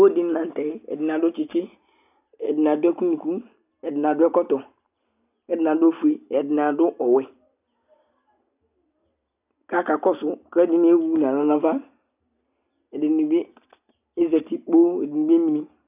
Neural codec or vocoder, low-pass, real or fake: none; 3.6 kHz; real